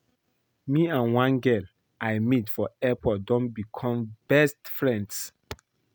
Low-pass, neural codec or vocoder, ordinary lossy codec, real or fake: none; none; none; real